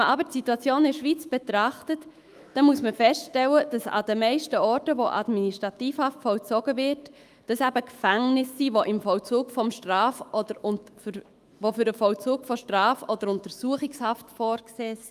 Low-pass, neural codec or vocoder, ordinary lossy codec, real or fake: 14.4 kHz; autoencoder, 48 kHz, 128 numbers a frame, DAC-VAE, trained on Japanese speech; Opus, 32 kbps; fake